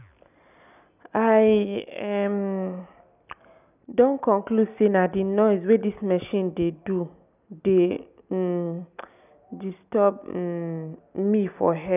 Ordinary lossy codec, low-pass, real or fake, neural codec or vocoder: none; 3.6 kHz; real; none